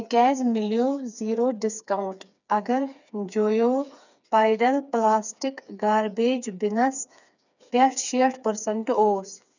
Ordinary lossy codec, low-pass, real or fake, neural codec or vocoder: none; 7.2 kHz; fake; codec, 16 kHz, 4 kbps, FreqCodec, smaller model